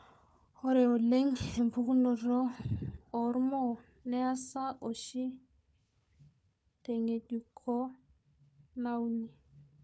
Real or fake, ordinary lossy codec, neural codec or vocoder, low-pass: fake; none; codec, 16 kHz, 4 kbps, FunCodec, trained on Chinese and English, 50 frames a second; none